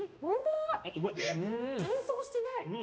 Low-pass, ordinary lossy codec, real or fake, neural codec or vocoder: none; none; fake; codec, 16 kHz, 1 kbps, X-Codec, HuBERT features, trained on balanced general audio